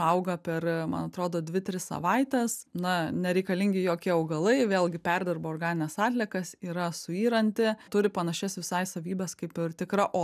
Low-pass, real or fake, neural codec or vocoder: 14.4 kHz; real; none